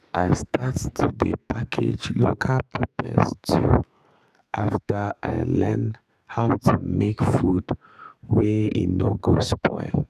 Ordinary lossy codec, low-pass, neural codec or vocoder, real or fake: none; 14.4 kHz; codec, 32 kHz, 1.9 kbps, SNAC; fake